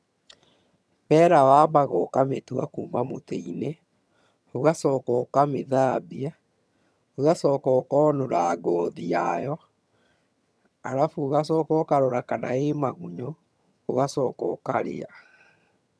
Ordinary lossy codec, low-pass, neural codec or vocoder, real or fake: none; none; vocoder, 22.05 kHz, 80 mel bands, HiFi-GAN; fake